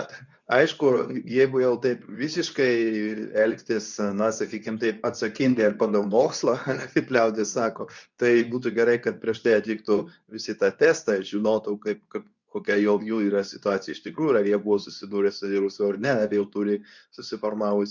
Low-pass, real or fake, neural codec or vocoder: 7.2 kHz; fake; codec, 24 kHz, 0.9 kbps, WavTokenizer, medium speech release version 2